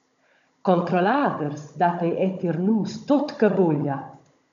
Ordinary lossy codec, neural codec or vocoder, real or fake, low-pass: MP3, 96 kbps; codec, 16 kHz, 16 kbps, FunCodec, trained on Chinese and English, 50 frames a second; fake; 7.2 kHz